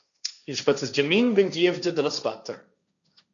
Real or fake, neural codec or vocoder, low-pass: fake; codec, 16 kHz, 1.1 kbps, Voila-Tokenizer; 7.2 kHz